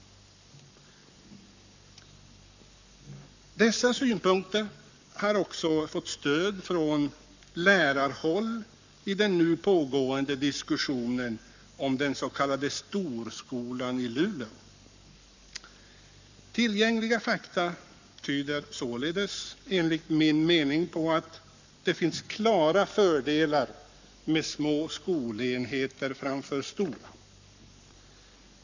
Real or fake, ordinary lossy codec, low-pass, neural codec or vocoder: fake; none; 7.2 kHz; codec, 44.1 kHz, 7.8 kbps, Pupu-Codec